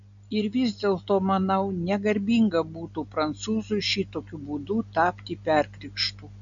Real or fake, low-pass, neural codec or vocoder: real; 7.2 kHz; none